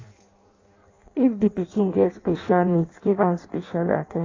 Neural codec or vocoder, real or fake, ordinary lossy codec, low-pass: codec, 16 kHz in and 24 kHz out, 0.6 kbps, FireRedTTS-2 codec; fake; AAC, 32 kbps; 7.2 kHz